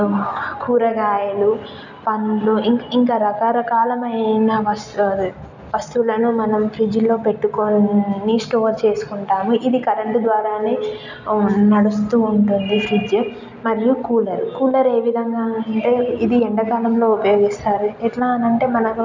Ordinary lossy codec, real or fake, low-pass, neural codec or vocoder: none; real; 7.2 kHz; none